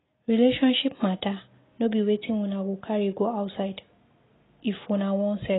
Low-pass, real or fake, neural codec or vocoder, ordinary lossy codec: 7.2 kHz; real; none; AAC, 16 kbps